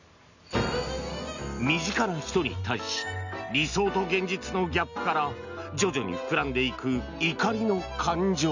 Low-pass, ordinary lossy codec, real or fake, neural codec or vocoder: 7.2 kHz; none; real; none